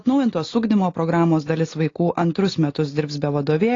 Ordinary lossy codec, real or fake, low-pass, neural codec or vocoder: AAC, 32 kbps; real; 7.2 kHz; none